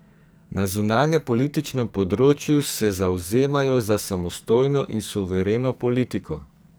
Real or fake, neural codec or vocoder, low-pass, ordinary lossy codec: fake; codec, 44.1 kHz, 2.6 kbps, SNAC; none; none